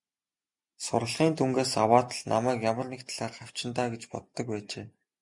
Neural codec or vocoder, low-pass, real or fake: none; 10.8 kHz; real